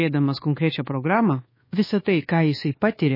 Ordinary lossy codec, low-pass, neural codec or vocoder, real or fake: MP3, 24 kbps; 5.4 kHz; codec, 24 kHz, 1.2 kbps, DualCodec; fake